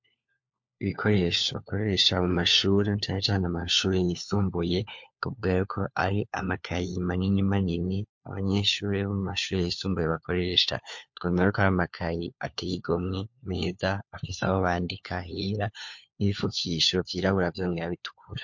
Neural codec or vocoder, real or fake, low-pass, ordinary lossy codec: codec, 16 kHz, 4 kbps, FunCodec, trained on LibriTTS, 50 frames a second; fake; 7.2 kHz; MP3, 48 kbps